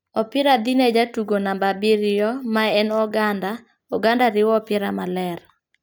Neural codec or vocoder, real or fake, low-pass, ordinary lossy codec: none; real; none; none